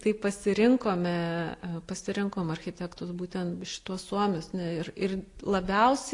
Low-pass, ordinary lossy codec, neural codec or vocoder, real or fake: 10.8 kHz; AAC, 48 kbps; none; real